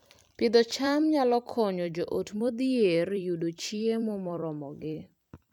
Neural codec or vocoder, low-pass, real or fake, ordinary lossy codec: vocoder, 44.1 kHz, 128 mel bands every 256 samples, BigVGAN v2; 19.8 kHz; fake; none